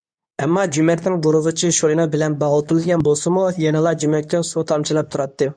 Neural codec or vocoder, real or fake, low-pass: codec, 24 kHz, 0.9 kbps, WavTokenizer, medium speech release version 2; fake; 9.9 kHz